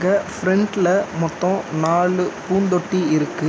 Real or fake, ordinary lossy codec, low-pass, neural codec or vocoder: real; none; none; none